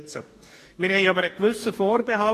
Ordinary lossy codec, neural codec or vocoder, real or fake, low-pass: AAC, 48 kbps; codec, 44.1 kHz, 2.6 kbps, DAC; fake; 14.4 kHz